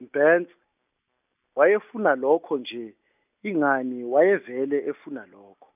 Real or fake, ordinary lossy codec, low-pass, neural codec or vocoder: real; none; 3.6 kHz; none